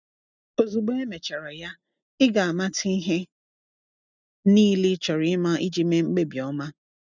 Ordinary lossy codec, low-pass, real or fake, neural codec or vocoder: none; 7.2 kHz; real; none